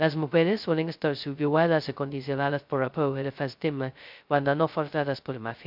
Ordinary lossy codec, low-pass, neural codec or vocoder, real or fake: MP3, 48 kbps; 5.4 kHz; codec, 16 kHz, 0.2 kbps, FocalCodec; fake